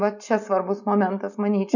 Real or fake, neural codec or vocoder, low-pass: real; none; 7.2 kHz